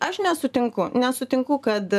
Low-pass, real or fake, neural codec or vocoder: 14.4 kHz; fake; vocoder, 44.1 kHz, 128 mel bands every 512 samples, BigVGAN v2